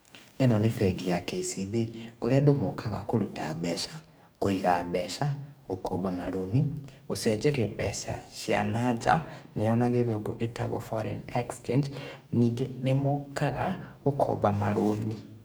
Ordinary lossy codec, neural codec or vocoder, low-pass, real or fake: none; codec, 44.1 kHz, 2.6 kbps, DAC; none; fake